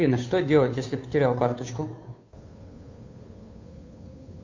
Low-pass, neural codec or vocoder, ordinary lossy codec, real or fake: 7.2 kHz; codec, 16 kHz, 2 kbps, FunCodec, trained on Chinese and English, 25 frames a second; AAC, 48 kbps; fake